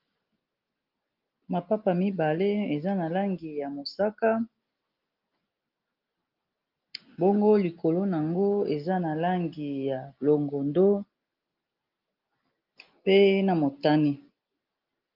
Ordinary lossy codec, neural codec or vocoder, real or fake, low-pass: Opus, 32 kbps; none; real; 5.4 kHz